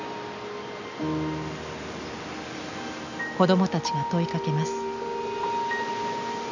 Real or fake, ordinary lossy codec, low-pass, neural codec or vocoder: real; none; 7.2 kHz; none